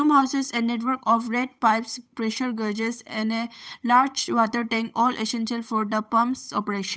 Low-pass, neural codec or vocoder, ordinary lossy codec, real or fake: none; codec, 16 kHz, 8 kbps, FunCodec, trained on Chinese and English, 25 frames a second; none; fake